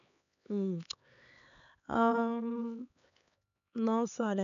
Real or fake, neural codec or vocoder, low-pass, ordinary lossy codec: fake; codec, 16 kHz, 4 kbps, X-Codec, HuBERT features, trained on LibriSpeech; 7.2 kHz; none